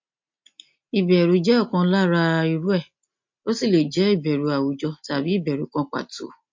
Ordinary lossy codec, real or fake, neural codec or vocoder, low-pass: MP3, 48 kbps; real; none; 7.2 kHz